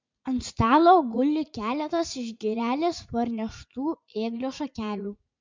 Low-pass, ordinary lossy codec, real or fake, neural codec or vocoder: 7.2 kHz; MP3, 64 kbps; fake; vocoder, 44.1 kHz, 80 mel bands, Vocos